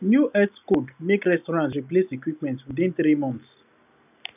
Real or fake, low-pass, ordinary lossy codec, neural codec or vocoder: real; 3.6 kHz; none; none